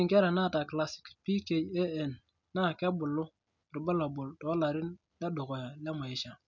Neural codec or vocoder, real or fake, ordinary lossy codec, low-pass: none; real; none; 7.2 kHz